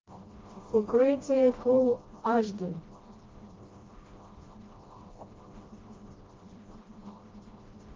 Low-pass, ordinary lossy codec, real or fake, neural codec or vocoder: 7.2 kHz; Opus, 32 kbps; fake; codec, 16 kHz, 1 kbps, FreqCodec, smaller model